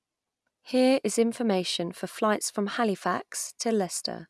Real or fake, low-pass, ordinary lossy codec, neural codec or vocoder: real; none; none; none